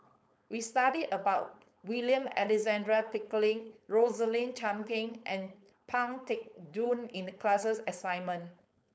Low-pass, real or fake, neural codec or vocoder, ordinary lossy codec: none; fake; codec, 16 kHz, 4.8 kbps, FACodec; none